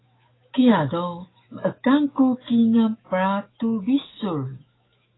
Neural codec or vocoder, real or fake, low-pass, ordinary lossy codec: autoencoder, 48 kHz, 128 numbers a frame, DAC-VAE, trained on Japanese speech; fake; 7.2 kHz; AAC, 16 kbps